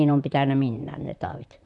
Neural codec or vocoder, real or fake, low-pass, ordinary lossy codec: vocoder, 44.1 kHz, 128 mel bands, Pupu-Vocoder; fake; 10.8 kHz; none